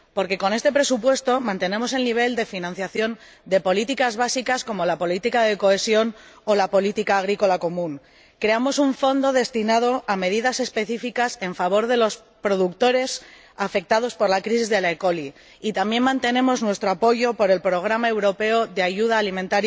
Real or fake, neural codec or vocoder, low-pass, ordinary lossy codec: real; none; none; none